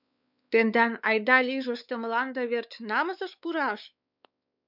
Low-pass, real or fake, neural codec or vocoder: 5.4 kHz; fake; codec, 16 kHz, 4 kbps, X-Codec, WavLM features, trained on Multilingual LibriSpeech